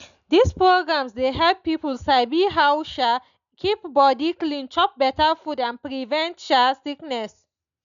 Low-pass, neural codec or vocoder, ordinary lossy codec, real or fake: 7.2 kHz; none; none; real